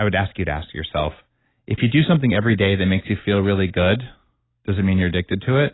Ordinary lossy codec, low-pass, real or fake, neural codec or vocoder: AAC, 16 kbps; 7.2 kHz; real; none